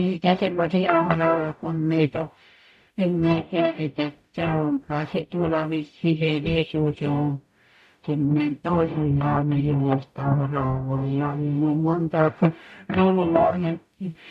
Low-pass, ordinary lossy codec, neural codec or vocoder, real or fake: 14.4 kHz; none; codec, 44.1 kHz, 0.9 kbps, DAC; fake